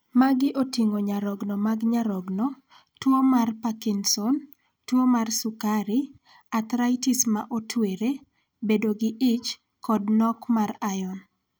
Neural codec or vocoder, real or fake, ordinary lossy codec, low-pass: none; real; none; none